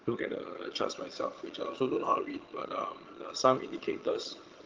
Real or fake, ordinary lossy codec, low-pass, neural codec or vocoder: fake; Opus, 16 kbps; 7.2 kHz; vocoder, 22.05 kHz, 80 mel bands, HiFi-GAN